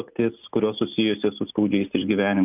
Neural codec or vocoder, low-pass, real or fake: none; 3.6 kHz; real